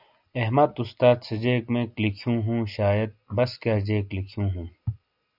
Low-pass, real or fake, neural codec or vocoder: 5.4 kHz; real; none